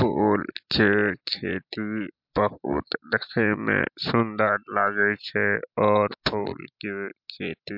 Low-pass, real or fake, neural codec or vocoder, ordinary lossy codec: 5.4 kHz; real; none; MP3, 48 kbps